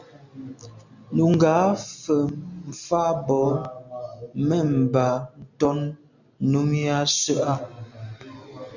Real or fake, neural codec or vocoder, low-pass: real; none; 7.2 kHz